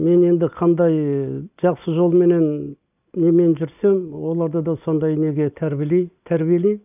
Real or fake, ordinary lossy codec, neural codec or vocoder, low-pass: real; none; none; 3.6 kHz